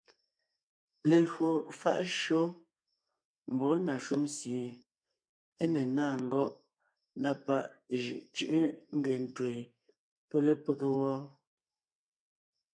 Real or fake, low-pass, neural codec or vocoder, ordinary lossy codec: fake; 9.9 kHz; codec, 32 kHz, 1.9 kbps, SNAC; MP3, 64 kbps